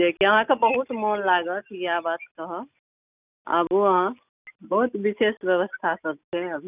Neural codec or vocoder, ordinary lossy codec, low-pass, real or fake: none; none; 3.6 kHz; real